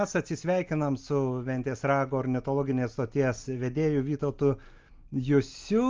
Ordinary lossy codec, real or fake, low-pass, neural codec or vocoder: Opus, 24 kbps; real; 7.2 kHz; none